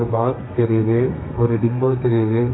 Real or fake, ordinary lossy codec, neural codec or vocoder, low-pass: fake; AAC, 16 kbps; codec, 32 kHz, 1.9 kbps, SNAC; 7.2 kHz